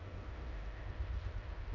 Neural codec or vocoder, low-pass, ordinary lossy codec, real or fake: codec, 16 kHz in and 24 kHz out, 0.9 kbps, LongCat-Audio-Codec, four codebook decoder; 7.2 kHz; none; fake